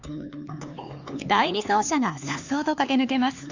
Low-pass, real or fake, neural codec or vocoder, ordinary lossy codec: 7.2 kHz; fake; codec, 16 kHz, 4 kbps, X-Codec, HuBERT features, trained on LibriSpeech; Opus, 64 kbps